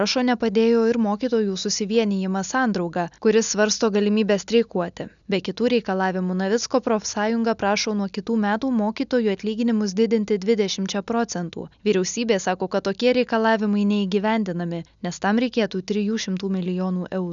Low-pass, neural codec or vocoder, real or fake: 7.2 kHz; none; real